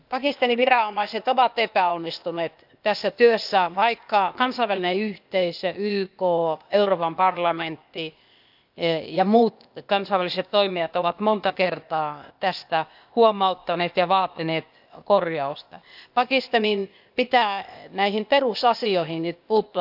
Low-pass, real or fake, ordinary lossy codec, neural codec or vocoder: 5.4 kHz; fake; none; codec, 16 kHz, 0.8 kbps, ZipCodec